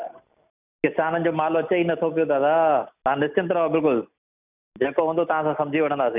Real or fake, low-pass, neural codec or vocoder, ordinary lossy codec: real; 3.6 kHz; none; none